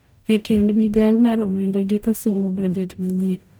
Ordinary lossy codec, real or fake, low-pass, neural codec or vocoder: none; fake; none; codec, 44.1 kHz, 0.9 kbps, DAC